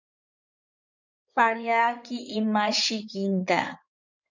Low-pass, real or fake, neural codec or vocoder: 7.2 kHz; fake; codec, 16 kHz in and 24 kHz out, 2.2 kbps, FireRedTTS-2 codec